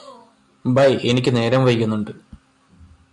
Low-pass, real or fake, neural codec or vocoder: 10.8 kHz; real; none